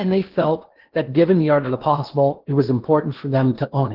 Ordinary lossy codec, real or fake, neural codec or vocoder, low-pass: Opus, 16 kbps; fake; codec, 16 kHz in and 24 kHz out, 0.8 kbps, FocalCodec, streaming, 65536 codes; 5.4 kHz